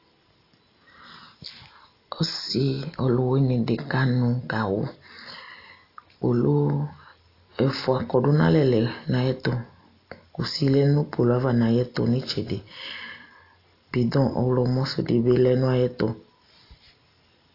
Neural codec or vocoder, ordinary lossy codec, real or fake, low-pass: none; AAC, 32 kbps; real; 5.4 kHz